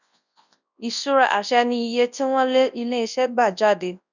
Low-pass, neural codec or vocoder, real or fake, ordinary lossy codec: 7.2 kHz; codec, 24 kHz, 0.9 kbps, WavTokenizer, large speech release; fake; none